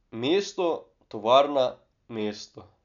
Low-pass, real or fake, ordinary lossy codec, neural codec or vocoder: 7.2 kHz; real; none; none